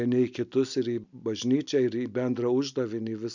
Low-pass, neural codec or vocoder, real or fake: 7.2 kHz; none; real